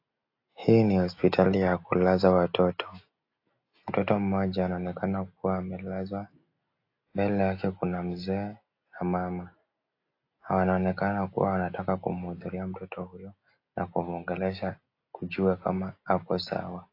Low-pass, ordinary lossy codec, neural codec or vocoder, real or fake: 5.4 kHz; AAC, 32 kbps; none; real